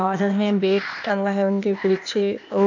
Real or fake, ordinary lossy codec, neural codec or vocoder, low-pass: fake; none; codec, 16 kHz, 0.8 kbps, ZipCodec; 7.2 kHz